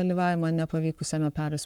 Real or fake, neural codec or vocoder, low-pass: fake; codec, 44.1 kHz, 7.8 kbps, Pupu-Codec; 19.8 kHz